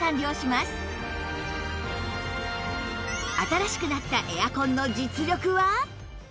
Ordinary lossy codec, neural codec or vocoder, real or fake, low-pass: none; none; real; none